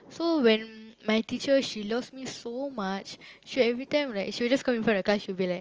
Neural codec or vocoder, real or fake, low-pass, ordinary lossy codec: none; real; 7.2 kHz; Opus, 24 kbps